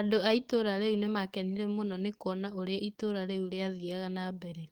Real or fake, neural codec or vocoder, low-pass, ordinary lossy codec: fake; codec, 44.1 kHz, 7.8 kbps, DAC; 19.8 kHz; Opus, 24 kbps